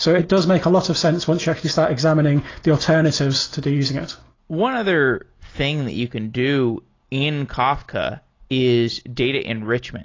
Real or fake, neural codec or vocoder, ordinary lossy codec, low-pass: real; none; AAC, 32 kbps; 7.2 kHz